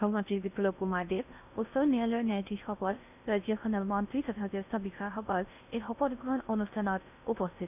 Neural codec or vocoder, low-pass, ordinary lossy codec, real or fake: codec, 16 kHz in and 24 kHz out, 0.6 kbps, FocalCodec, streaming, 4096 codes; 3.6 kHz; none; fake